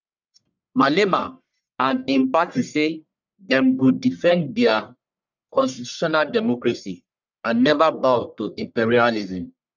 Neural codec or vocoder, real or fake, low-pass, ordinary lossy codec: codec, 44.1 kHz, 1.7 kbps, Pupu-Codec; fake; 7.2 kHz; none